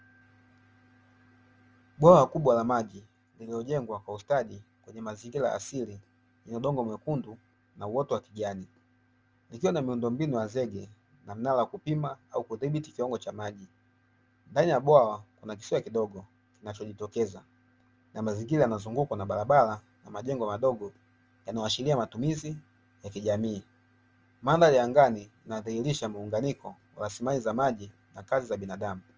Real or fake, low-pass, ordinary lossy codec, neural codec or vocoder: real; 7.2 kHz; Opus, 24 kbps; none